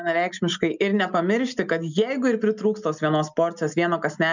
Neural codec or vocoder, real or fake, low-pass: none; real; 7.2 kHz